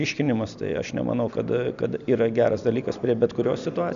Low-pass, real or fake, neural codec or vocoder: 7.2 kHz; real; none